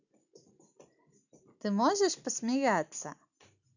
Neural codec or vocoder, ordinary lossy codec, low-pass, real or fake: none; none; 7.2 kHz; real